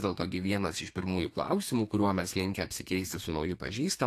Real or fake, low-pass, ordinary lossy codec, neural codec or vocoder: fake; 14.4 kHz; AAC, 64 kbps; codec, 32 kHz, 1.9 kbps, SNAC